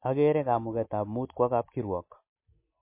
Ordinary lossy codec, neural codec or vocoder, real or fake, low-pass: MP3, 24 kbps; none; real; 3.6 kHz